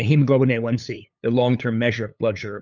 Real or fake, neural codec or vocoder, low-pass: fake; codec, 16 kHz, 8 kbps, FunCodec, trained on LibriTTS, 25 frames a second; 7.2 kHz